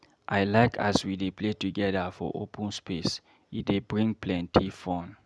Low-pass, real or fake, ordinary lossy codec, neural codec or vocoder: none; real; none; none